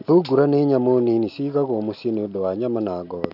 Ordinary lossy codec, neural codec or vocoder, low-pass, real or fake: none; none; 5.4 kHz; real